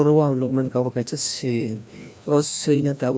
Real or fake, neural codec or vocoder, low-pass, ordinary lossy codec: fake; codec, 16 kHz, 1 kbps, FreqCodec, larger model; none; none